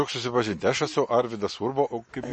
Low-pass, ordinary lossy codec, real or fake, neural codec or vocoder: 10.8 kHz; MP3, 32 kbps; real; none